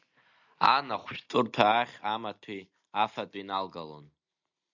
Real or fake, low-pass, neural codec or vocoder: real; 7.2 kHz; none